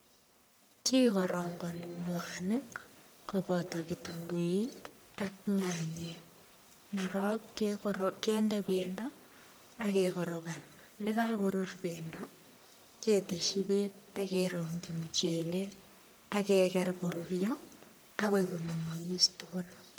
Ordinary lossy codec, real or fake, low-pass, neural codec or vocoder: none; fake; none; codec, 44.1 kHz, 1.7 kbps, Pupu-Codec